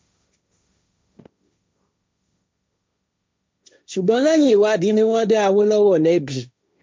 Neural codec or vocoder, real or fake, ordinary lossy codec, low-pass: codec, 16 kHz, 1.1 kbps, Voila-Tokenizer; fake; none; none